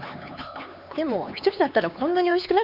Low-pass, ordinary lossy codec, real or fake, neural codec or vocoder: 5.4 kHz; none; fake; codec, 16 kHz, 4 kbps, X-Codec, HuBERT features, trained on LibriSpeech